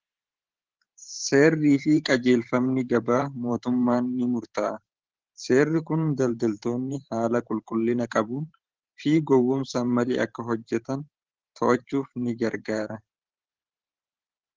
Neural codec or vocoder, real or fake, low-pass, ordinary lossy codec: vocoder, 44.1 kHz, 128 mel bands every 512 samples, BigVGAN v2; fake; 7.2 kHz; Opus, 16 kbps